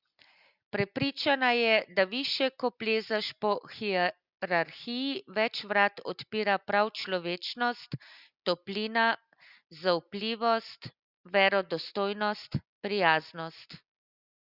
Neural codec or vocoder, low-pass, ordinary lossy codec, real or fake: none; 5.4 kHz; Opus, 64 kbps; real